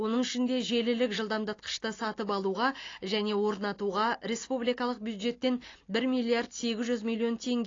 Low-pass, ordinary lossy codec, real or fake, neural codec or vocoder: 7.2 kHz; AAC, 32 kbps; real; none